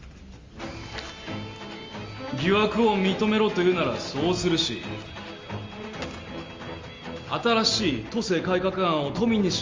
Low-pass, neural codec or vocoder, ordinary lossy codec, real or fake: 7.2 kHz; none; Opus, 32 kbps; real